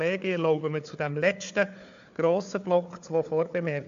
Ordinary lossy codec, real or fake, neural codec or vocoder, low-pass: AAC, 64 kbps; fake; codec, 16 kHz, 4 kbps, FunCodec, trained on Chinese and English, 50 frames a second; 7.2 kHz